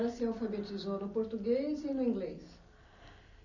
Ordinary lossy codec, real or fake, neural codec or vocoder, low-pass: none; real; none; 7.2 kHz